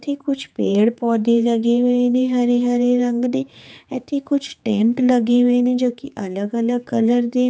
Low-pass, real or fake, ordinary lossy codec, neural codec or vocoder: none; fake; none; codec, 16 kHz, 4 kbps, X-Codec, HuBERT features, trained on general audio